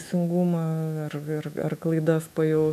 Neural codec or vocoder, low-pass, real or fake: autoencoder, 48 kHz, 128 numbers a frame, DAC-VAE, trained on Japanese speech; 14.4 kHz; fake